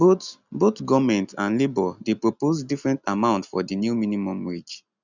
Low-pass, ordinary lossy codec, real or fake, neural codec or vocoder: 7.2 kHz; none; real; none